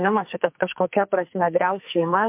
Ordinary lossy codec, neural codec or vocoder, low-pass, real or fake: MP3, 32 kbps; codec, 44.1 kHz, 2.6 kbps, SNAC; 3.6 kHz; fake